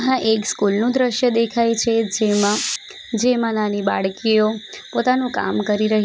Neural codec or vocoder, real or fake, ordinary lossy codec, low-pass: none; real; none; none